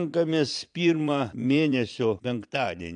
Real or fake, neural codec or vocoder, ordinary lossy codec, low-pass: fake; vocoder, 22.05 kHz, 80 mel bands, Vocos; AAC, 64 kbps; 9.9 kHz